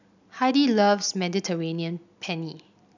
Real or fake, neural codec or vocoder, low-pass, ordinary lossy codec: real; none; 7.2 kHz; none